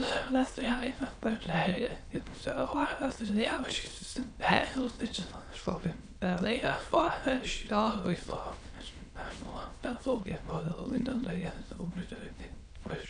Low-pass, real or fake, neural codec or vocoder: 9.9 kHz; fake; autoencoder, 22.05 kHz, a latent of 192 numbers a frame, VITS, trained on many speakers